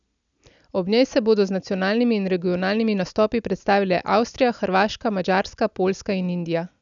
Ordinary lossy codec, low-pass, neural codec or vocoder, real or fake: none; 7.2 kHz; none; real